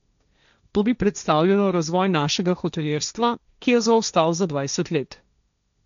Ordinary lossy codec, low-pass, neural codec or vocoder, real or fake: none; 7.2 kHz; codec, 16 kHz, 1.1 kbps, Voila-Tokenizer; fake